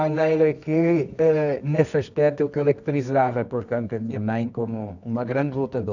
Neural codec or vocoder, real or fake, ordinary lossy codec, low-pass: codec, 24 kHz, 0.9 kbps, WavTokenizer, medium music audio release; fake; none; 7.2 kHz